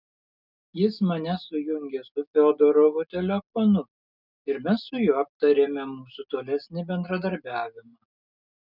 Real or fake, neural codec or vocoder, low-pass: real; none; 5.4 kHz